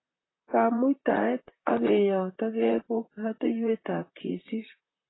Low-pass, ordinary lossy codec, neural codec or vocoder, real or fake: 7.2 kHz; AAC, 16 kbps; vocoder, 44.1 kHz, 128 mel bands, Pupu-Vocoder; fake